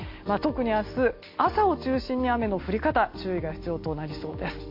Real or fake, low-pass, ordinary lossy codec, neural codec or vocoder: real; 5.4 kHz; MP3, 32 kbps; none